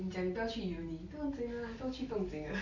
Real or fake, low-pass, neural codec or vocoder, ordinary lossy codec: real; 7.2 kHz; none; none